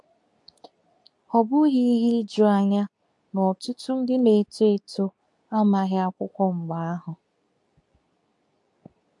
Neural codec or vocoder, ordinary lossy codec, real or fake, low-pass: codec, 24 kHz, 0.9 kbps, WavTokenizer, medium speech release version 2; AAC, 64 kbps; fake; 10.8 kHz